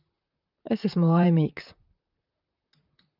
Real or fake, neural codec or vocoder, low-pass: fake; vocoder, 44.1 kHz, 128 mel bands, Pupu-Vocoder; 5.4 kHz